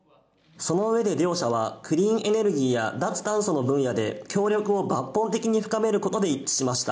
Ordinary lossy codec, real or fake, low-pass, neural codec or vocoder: none; real; none; none